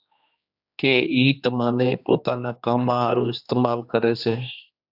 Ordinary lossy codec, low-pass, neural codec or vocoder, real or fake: AAC, 48 kbps; 5.4 kHz; codec, 16 kHz, 2 kbps, X-Codec, HuBERT features, trained on general audio; fake